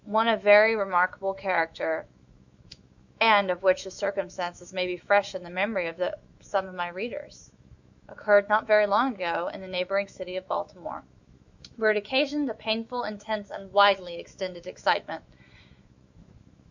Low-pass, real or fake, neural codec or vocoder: 7.2 kHz; fake; autoencoder, 48 kHz, 128 numbers a frame, DAC-VAE, trained on Japanese speech